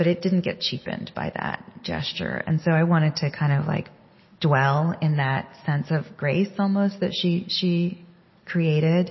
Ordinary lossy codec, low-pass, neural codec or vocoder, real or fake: MP3, 24 kbps; 7.2 kHz; none; real